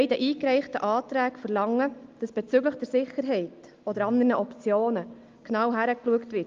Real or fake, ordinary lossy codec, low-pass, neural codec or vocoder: real; Opus, 32 kbps; 7.2 kHz; none